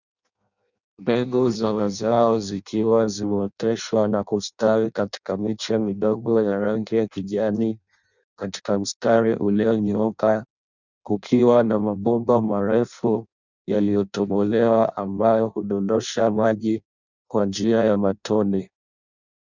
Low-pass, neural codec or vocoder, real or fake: 7.2 kHz; codec, 16 kHz in and 24 kHz out, 0.6 kbps, FireRedTTS-2 codec; fake